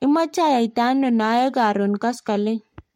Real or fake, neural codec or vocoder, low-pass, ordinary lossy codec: fake; codec, 44.1 kHz, 7.8 kbps, Pupu-Codec; 19.8 kHz; MP3, 64 kbps